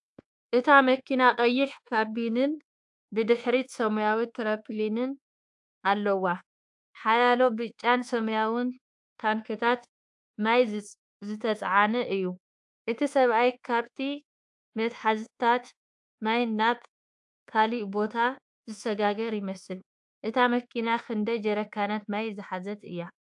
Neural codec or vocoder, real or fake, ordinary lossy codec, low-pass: codec, 24 kHz, 1.2 kbps, DualCodec; fake; AAC, 64 kbps; 10.8 kHz